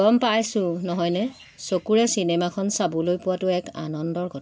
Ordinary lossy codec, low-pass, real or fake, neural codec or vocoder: none; none; real; none